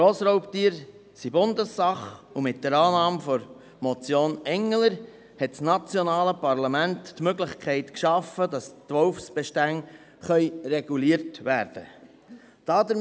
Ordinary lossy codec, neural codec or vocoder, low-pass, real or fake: none; none; none; real